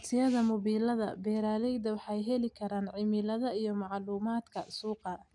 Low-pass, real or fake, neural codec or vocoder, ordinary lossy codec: 10.8 kHz; real; none; none